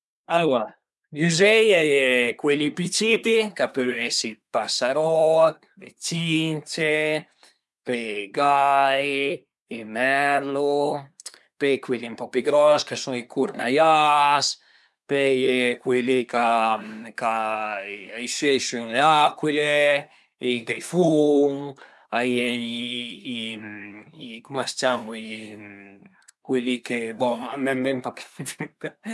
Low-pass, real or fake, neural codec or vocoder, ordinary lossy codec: none; fake; codec, 24 kHz, 1 kbps, SNAC; none